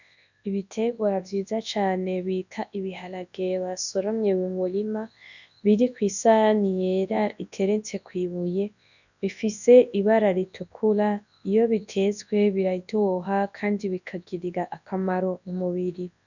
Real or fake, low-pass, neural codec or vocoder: fake; 7.2 kHz; codec, 24 kHz, 0.9 kbps, WavTokenizer, large speech release